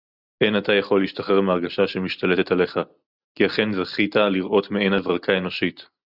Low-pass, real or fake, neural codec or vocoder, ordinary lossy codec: 5.4 kHz; real; none; Opus, 64 kbps